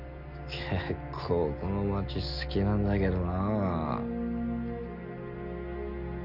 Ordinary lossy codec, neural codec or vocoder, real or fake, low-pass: Opus, 64 kbps; none; real; 5.4 kHz